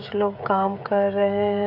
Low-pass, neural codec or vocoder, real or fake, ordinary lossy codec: 5.4 kHz; codec, 16 kHz, 16 kbps, FreqCodec, smaller model; fake; none